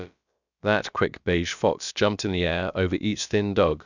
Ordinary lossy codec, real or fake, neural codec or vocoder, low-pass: none; fake; codec, 16 kHz, about 1 kbps, DyCAST, with the encoder's durations; 7.2 kHz